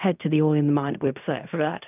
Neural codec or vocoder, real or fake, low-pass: codec, 16 kHz in and 24 kHz out, 0.4 kbps, LongCat-Audio-Codec, fine tuned four codebook decoder; fake; 3.6 kHz